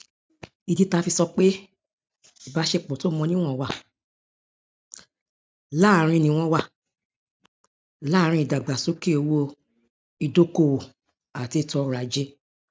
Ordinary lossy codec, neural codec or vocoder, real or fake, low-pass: none; none; real; none